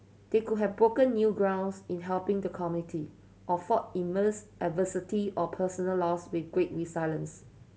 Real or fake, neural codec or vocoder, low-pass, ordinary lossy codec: real; none; none; none